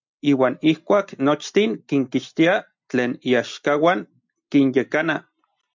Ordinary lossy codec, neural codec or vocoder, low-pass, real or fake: MP3, 64 kbps; none; 7.2 kHz; real